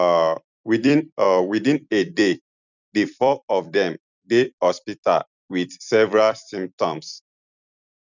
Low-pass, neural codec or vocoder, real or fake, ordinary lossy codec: 7.2 kHz; vocoder, 44.1 kHz, 128 mel bands every 256 samples, BigVGAN v2; fake; none